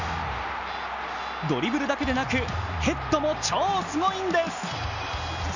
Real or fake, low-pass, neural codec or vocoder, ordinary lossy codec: real; 7.2 kHz; none; none